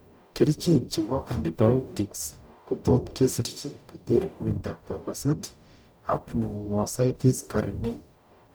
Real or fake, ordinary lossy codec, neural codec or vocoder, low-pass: fake; none; codec, 44.1 kHz, 0.9 kbps, DAC; none